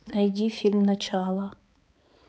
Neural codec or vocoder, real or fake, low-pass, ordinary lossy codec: codec, 16 kHz, 4 kbps, X-Codec, HuBERT features, trained on balanced general audio; fake; none; none